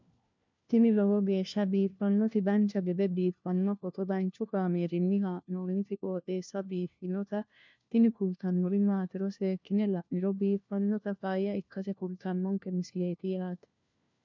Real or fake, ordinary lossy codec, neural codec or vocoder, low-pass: fake; MP3, 64 kbps; codec, 16 kHz, 1 kbps, FunCodec, trained on LibriTTS, 50 frames a second; 7.2 kHz